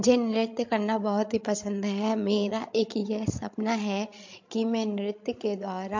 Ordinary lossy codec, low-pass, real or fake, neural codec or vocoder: MP3, 48 kbps; 7.2 kHz; fake; codec, 16 kHz, 16 kbps, FreqCodec, larger model